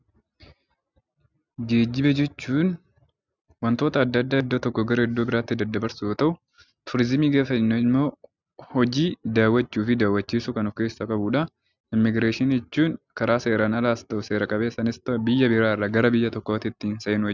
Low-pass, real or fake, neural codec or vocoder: 7.2 kHz; real; none